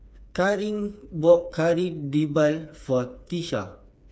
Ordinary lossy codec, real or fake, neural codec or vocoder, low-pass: none; fake; codec, 16 kHz, 4 kbps, FreqCodec, smaller model; none